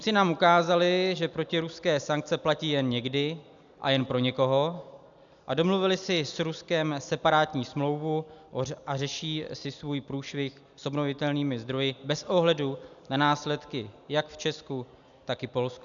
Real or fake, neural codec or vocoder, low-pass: real; none; 7.2 kHz